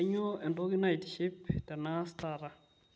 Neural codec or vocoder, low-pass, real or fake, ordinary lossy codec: none; none; real; none